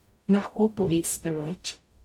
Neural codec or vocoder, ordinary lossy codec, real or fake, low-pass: codec, 44.1 kHz, 0.9 kbps, DAC; none; fake; 19.8 kHz